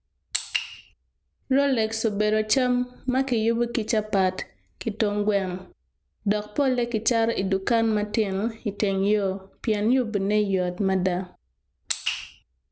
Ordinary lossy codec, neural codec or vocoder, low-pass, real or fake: none; none; none; real